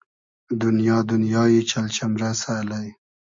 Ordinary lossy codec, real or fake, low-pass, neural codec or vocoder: MP3, 48 kbps; real; 7.2 kHz; none